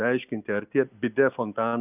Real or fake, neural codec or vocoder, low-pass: real; none; 3.6 kHz